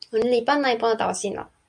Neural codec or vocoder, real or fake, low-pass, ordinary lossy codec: none; real; 9.9 kHz; MP3, 64 kbps